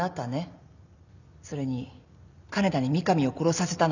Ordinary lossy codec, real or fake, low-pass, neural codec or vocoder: none; real; 7.2 kHz; none